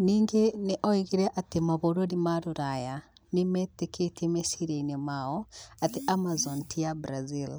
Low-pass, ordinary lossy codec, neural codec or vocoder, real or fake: none; none; none; real